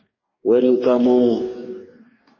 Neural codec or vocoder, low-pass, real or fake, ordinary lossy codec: codec, 44.1 kHz, 2.6 kbps, DAC; 7.2 kHz; fake; MP3, 32 kbps